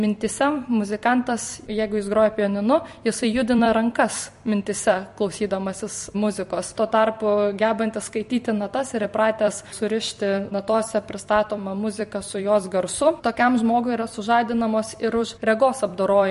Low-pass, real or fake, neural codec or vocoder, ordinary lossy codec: 14.4 kHz; fake; vocoder, 44.1 kHz, 128 mel bands every 256 samples, BigVGAN v2; MP3, 48 kbps